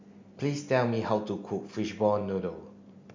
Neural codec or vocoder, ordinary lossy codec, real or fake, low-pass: none; MP3, 64 kbps; real; 7.2 kHz